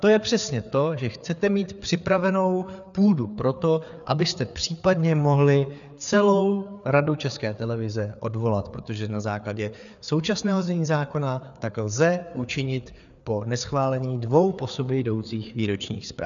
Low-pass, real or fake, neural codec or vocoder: 7.2 kHz; fake; codec, 16 kHz, 4 kbps, FreqCodec, larger model